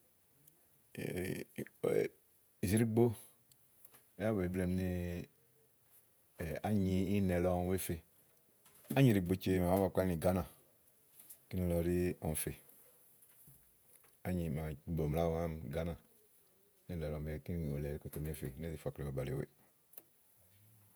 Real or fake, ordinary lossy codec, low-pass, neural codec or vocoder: fake; none; none; vocoder, 48 kHz, 128 mel bands, Vocos